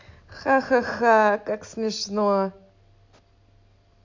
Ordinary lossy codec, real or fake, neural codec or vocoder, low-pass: MP3, 48 kbps; real; none; 7.2 kHz